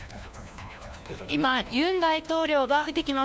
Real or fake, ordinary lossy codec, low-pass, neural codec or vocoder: fake; none; none; codec, 16 kHz, 1 kbps, FunCodec, trained on LibriTTS, 50 frames a second